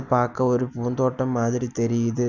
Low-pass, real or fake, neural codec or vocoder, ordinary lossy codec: 7.2 kHz; real; none; none